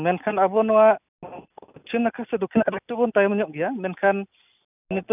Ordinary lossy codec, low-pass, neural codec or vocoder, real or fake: none; 3.6 kHz; none; real